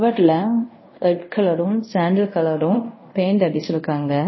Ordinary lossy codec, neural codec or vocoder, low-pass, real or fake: MP3, 24 kbps; codec, 24 kHz, 0.5 kbps, DualCodec; 7.2 kHz; fake